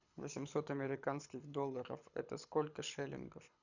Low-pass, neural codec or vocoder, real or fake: 7.2 kHz; codec, 24 kHz, 6 kbps, HILCodec; fake